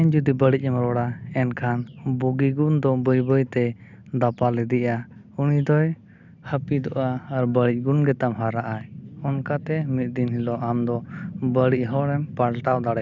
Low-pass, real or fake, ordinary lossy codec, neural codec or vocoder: 7.2 kHz; real; none; none